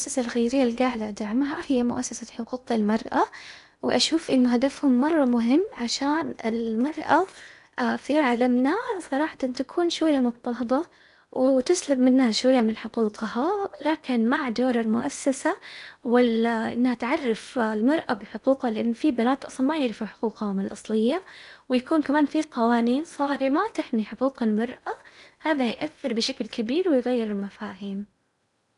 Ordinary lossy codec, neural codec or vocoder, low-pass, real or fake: none; codec, 16 kHz in and 24 kHz out, 0.8 kbps, FocalCodec, streaming, 65536 codes; 10.8 kHz; fake